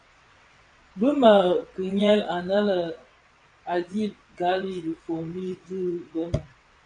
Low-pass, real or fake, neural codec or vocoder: 9.9 kHz; fake; vocoder, 22.05 kHz, 80 mel bands, WaveNeXt